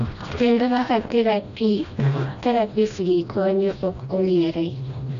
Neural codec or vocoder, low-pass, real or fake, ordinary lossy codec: codec, 16 kHz, 1 kbps, FreqCodec, smaller model; 7.2 kHz; fake; none